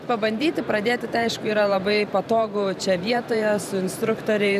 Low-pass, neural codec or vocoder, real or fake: 14.4 kHz; vocoder, 44.1 kHz, 128 mel bands every 512 samples, BigVGAN v2; fake